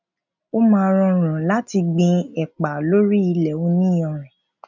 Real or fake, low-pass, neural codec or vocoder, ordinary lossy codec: real; 7.2 kHz; none; none